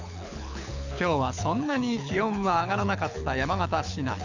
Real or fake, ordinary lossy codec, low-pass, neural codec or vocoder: fake; none; 7.2 kHz; codec, 24 kHz, 6 kbps, HILCodec